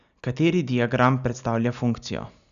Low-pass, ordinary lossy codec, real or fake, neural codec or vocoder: 7.2 kHz; none; real; none